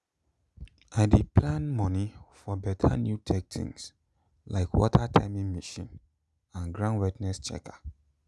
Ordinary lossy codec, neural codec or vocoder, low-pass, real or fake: none; none; none; real